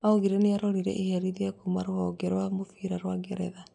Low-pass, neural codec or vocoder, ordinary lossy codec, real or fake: 9.9 kHz; none; none; real